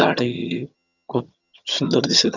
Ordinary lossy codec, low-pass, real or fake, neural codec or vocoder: none; 7.2 kHz; fake; vocoder, 22.05 kHz, 80 mel bands, HiFi-GAN